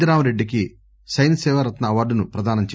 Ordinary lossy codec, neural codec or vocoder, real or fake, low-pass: none; none; real; none